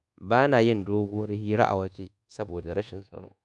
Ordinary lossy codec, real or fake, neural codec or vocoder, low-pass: none; fake; codec, 24 kHz, 1.2 kbps, DualCodec; 10.8 kHz